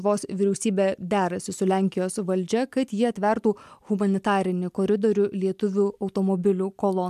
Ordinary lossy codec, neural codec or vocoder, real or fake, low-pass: MP3, 96 kbps; none; real; 14.4 kHz